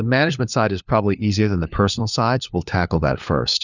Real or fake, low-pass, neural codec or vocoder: fake; 7.2 kHz; codec, 16 kHz, 4 kbps, FunCodec, trained on LibriTTS, 50 frames a second